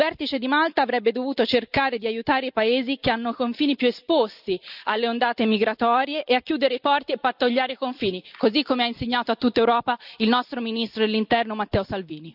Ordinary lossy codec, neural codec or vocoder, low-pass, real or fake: none; none; 5.4 kHz; real